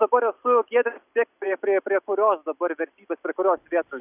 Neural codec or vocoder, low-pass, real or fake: none; 3.6 kHz; real